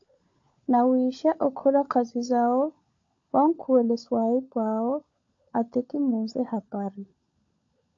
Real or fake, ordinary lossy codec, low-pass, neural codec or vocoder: fake; AAC, 48 kbps; 7.2 kHz; codec, 16 kHz, 16 kbps, FunCodec, trained on LibriTTS, 50 frames a second